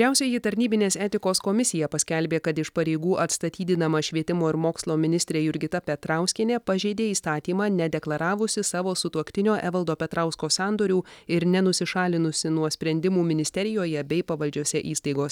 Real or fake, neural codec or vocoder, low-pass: real; none; 19.8 kHz